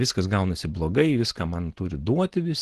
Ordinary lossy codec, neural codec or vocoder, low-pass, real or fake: Opus, 16 kbps; none; 10.8 kHz; real